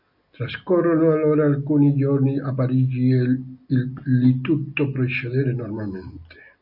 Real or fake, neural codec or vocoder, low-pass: real; none; 5.4 kHz